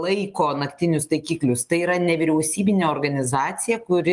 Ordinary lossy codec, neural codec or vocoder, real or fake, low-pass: Opus, 32 kbps; none; real; 10.8 kHz